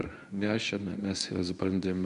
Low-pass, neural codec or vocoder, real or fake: 10.8 kHz; codec, 24 kHz, 0.9 kbps, WavTokenizer, medium speech release version 1; fake